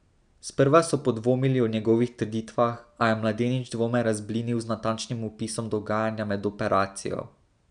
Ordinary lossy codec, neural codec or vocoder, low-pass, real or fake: none; none; 9.9 kHz; real